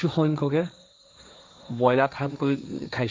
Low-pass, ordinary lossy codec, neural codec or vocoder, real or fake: none; none; codec, 16 kHz, 1.1 kbps, Voila-Tokenizer; fake